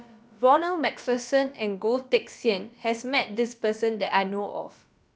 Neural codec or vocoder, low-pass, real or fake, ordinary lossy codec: codec, 16 kHz, about 1 kbps, DyCAST, with the encoder's durations; none; fake; none